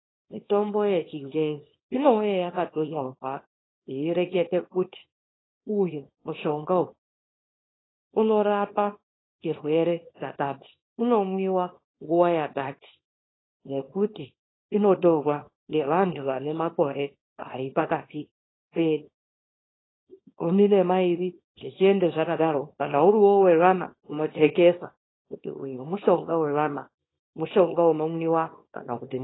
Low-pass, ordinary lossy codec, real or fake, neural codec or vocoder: 7.2 kHz; AAC, 16 kbps; fake; codec, 24 kHz, 0.9 kbps, WavTokenizer, small release